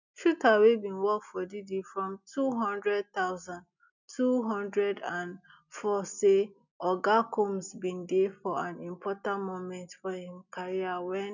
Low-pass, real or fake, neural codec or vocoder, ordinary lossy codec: 7.2 kHz; real; none; none